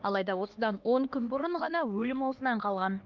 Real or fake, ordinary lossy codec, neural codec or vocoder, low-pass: fake; Opus, 32 kbps; codec, 16 kHz, 2 kbps, X-Codec, HuBERT features, trained on LibriSpeech; 7.2 kHz